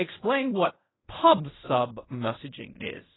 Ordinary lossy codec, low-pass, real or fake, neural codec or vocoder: AAC, 16 kbps; 7.2 kHz; fake; codec, 16 kHz in and 24 kHz out, 0.4 kbps, LongCat-Audio-Codec, fine tuned four codebook decoder